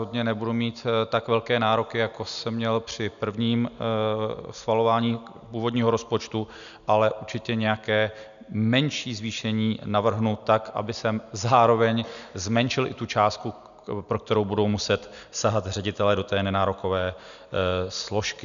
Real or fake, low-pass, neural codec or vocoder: real; 7.2 kHz; none